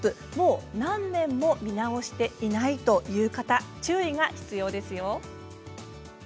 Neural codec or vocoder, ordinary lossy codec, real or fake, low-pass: none; none; real; none